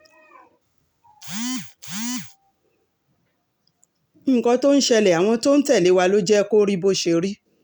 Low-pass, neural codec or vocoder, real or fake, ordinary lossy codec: none; none; real; none